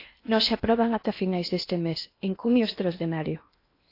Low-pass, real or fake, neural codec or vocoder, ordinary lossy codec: 5.4 kHz; fake; codec, 16 kHz in and 24 kHz out, 0.8 kbps, FocalCodec, streaming, 65536 codes; AAC, 32 kbps